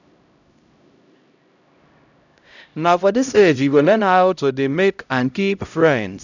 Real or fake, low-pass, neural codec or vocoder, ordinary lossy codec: fake; 7.2 kHz; codec, 16 kHz, 0.5 kbps, X-Codec, HuBERT features, trained on LibriSpeech; none